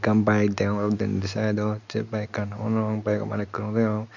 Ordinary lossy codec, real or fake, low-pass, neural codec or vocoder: none; real; 7.2 kHz; none